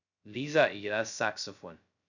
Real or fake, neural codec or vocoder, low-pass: fake; codec, 16 kHz, 0.2 kbps, FocalCodec; 7.2 kHz